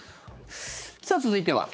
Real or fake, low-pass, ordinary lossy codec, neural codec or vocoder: fake; none; none; codec, 16 kHz, 4 kbps, X-Codec, HuBERT features, trained on general audio